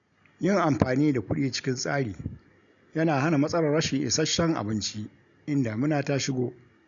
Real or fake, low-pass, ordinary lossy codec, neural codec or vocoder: real; 7.2 kHz; none; none